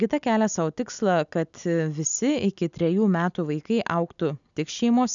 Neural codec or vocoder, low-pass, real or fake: none; 7.2 kHz; real